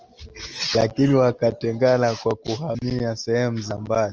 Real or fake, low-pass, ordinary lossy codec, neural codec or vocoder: real; 7.2 kHz; Opus, 24 kbps; none